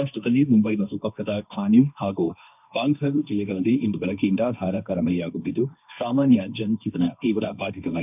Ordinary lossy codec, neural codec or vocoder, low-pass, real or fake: none; codec, 16 kHz, 1.1 kbps, Voila-Tokenizer; 3.6 kHz; fake